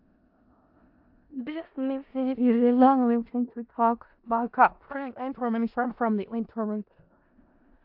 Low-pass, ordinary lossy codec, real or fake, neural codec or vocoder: 5.4 kHz; AAC, 48 kbps; fake; codec, 16 kHz in and 24 kHz out, 0.4 kbps, LongCat-Audio-Codec, four codebook decoder